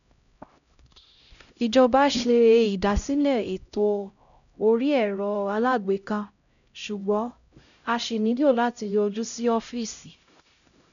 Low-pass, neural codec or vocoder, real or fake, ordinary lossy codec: 7.2 kHz; codec, 16 kHz, 0.5 kbps, X-Codec, HuBERT features, trained on LibriSpeech; fake; none